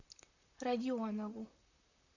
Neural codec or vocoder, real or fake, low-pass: vocoder, 44.1 kHz, 128 mel bands, Pupu-Vocoder; fake; 7.2 kHz